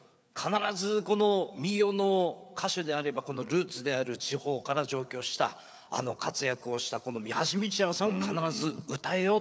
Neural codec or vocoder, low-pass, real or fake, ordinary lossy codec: codec, 16 kHz, 4 kbps, FreqCodec, larger model; none; fake; none